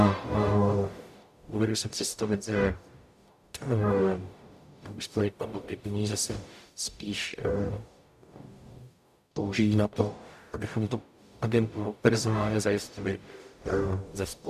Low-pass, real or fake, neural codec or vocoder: 14.4 kHz; fake; codec, 44.1 kHz, 0.9 kbps, DAC